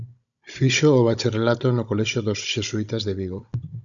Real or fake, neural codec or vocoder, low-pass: fake; codec, 16 kHz, 16 kbps, FunCodec, trained on Chinese and English, 50 frames a second; 7.2 kHz